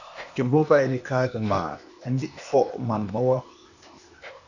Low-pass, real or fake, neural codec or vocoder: 7.2 kHz; fake; codec, 16 kHz, 0.8 kbps, ZipCodec